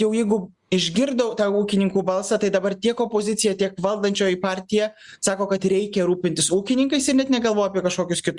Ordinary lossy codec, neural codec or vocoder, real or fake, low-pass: Opus, 64 kbps; none; real; 10.8 kHz